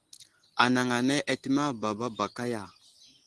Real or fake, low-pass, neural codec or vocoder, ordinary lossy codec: real; 10.8 kHz; none; Opus, 16 kbps